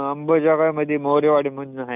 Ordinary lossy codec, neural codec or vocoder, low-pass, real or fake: none; none; 3.6 kHz; real